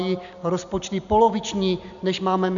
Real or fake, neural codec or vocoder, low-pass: real; none; 7.2 kHz